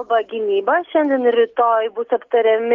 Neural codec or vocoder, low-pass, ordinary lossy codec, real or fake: none; 7.2 kHz; Opus, 24 kbps; real